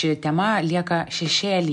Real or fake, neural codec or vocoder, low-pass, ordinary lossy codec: real; none; 10.8 kHz; MP3, 96 kbps